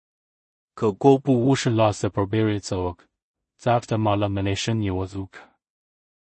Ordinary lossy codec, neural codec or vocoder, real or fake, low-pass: MP3, 32 kbps; codec, 16 kHz in and 24 kHz out, 0.4 kbps, LongCat-Audio-Codec, two codebook decoder; fake; 10.8 kHz